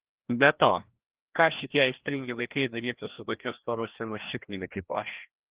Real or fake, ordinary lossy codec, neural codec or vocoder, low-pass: fake; Opus, 16 kbps; codec, 16 kHz, 1 kbps, FreqCodec, larger model; 3.6 kHz